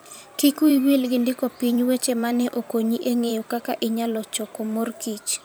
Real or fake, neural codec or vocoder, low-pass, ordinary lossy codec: fake; vocoder, 44.1 kHz, 128 mel bands every 512 samples, BigVGAN v2; none; none